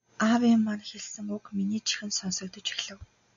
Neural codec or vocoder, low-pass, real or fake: none; 7.2 kHz; real